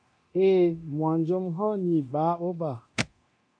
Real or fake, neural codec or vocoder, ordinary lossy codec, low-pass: fake; codec, 24 kHz, 0.9 kbps, DualCodec; AAC, 64 kbps; 9.9 kHz